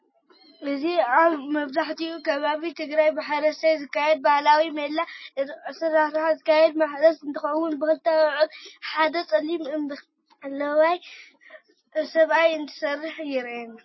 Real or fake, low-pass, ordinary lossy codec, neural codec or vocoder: real; 7.2 kHz; MP3, 24 kbps; none